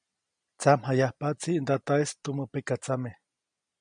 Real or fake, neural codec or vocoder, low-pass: real; none; 9.9 kHz